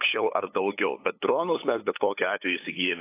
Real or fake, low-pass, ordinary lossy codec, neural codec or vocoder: fake; 3.6 kHz; AAC, 24 kbps; codec, 16 kHz, 8 kbps, FunCodec, trained on LibriTTS, 25 frames a second